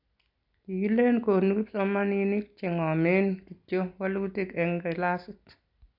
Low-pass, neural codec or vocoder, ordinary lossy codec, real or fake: 5.4 kHz; none; none; real